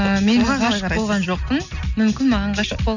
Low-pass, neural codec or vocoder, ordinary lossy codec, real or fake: 7.2 kHz; none; none; real